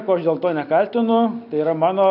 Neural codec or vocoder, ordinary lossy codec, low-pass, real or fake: none; MP3, 48 kbps; 5.4 kHz; real